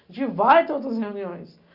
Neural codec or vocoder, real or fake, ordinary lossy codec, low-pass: none; real; Opus, 64 kbps; 5.4 kHz